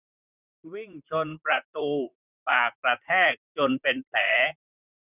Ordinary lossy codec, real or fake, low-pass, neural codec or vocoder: none; fake; 3.6 kHz; vocoder, 44.1 kHz, 128 mel bands, Pupu-Vocoder